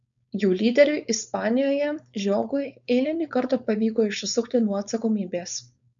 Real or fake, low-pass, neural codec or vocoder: fake; 7.2 kHz; codec, 16 kHz, 4.8 kbps, FACodec